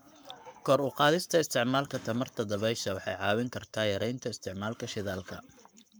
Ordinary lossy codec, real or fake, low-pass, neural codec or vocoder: none; fake; none; codec, 44.1 kHz, 7.8 kbps, Pupu-Codec